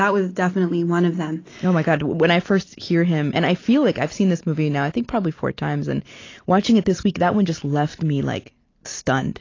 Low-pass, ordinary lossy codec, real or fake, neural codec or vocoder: 7.2 kHz; AAC, 32 kbps; real; none